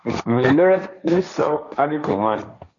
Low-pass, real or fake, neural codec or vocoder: 7.2 kHz; fake; codec, 16 kHz, 1.1 kbps, Voila-Tokenizer